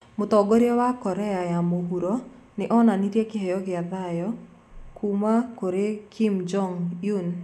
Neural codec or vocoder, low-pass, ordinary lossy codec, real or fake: none; 14.4 kHz; none; real